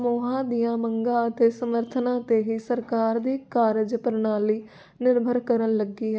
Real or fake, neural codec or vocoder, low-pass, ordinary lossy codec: real; none; none; none